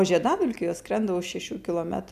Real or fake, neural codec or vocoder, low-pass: real; none; 14.4 kHz